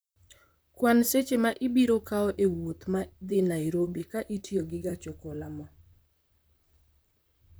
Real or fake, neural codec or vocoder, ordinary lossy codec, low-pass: fake; vocoder, 44.1 kHz, 128 mel bands, Pupu-Vocoder; none; none